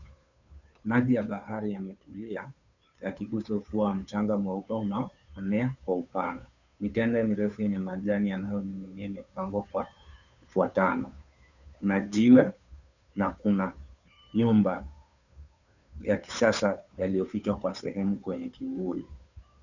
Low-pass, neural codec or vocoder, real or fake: 7.2 kHz; codec, 16 kHz, 2 kbps, FunCodec, trained on Chinese and English, 25 frames a second; fake